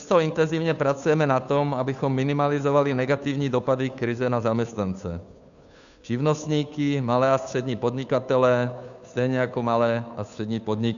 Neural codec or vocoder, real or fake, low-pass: codec, 16 kHz, 2 kbps, FunCodec, trained on Chinese and English, 25 frames a second; fake; 7.2 kHz